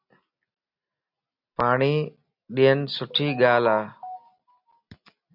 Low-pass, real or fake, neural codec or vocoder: 5.4 kHz; real; none